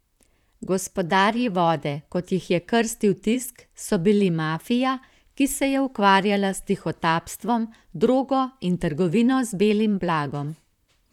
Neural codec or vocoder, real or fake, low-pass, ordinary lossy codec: vocoder, 44.1 kHz, 128 mel bands, Pupu-Vocoder; fake; 19.8 kHz; none